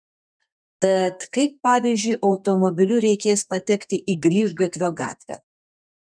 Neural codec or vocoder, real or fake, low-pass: codec, 32 kHz, 1.9 kbps, SNAC; fake; 9.9 kHz